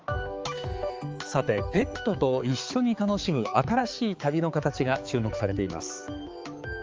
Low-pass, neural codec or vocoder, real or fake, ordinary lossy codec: 7.2 kHz; codec, 16 kHz, 4 kbps, X-Codec, HuBERT features, trained on balanced general audio; fake; Opus, 24 kbps